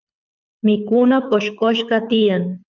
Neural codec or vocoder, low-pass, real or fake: codec, 24 kHz, 6 kbps, HILCodec; 7.2 kHz; fake